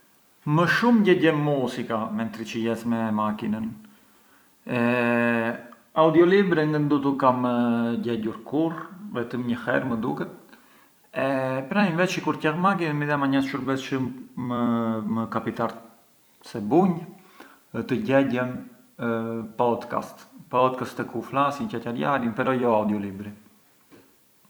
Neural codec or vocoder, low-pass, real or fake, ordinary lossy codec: vocoder, 44.1 kHz, 128 mel bands every 256 samples, BigVGAN v2; none; fake; none